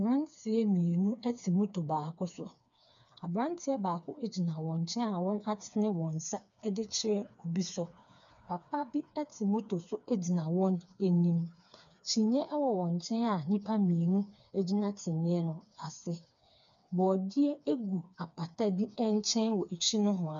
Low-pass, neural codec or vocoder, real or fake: 7.2 kHz; codec, 16 kHz, 4 kbps, FreqCodec, smaller model; fake